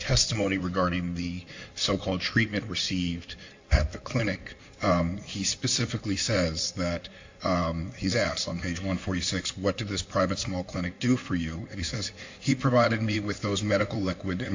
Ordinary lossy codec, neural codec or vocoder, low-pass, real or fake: AAC, 48 kbps; codec, 16 kHz in and 24 kHz out, 2.2 kbps, FireRedTTS-2 codec; 7.2 kHz; fake